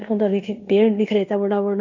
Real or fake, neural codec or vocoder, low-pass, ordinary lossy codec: fake; codec, 16 kHz in and 24 kHz out, 0.9 kbps, LongCat-Audio-Codec, fine tuned four codebook decoder; 7.2 kHz; none